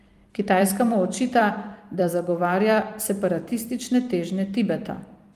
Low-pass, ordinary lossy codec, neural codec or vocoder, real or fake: 14.4 kHz; Opus, 32 kbps; vocoder, 44.1 kHz, 128 mel bands every 256 samples, BigVGAN v2; fake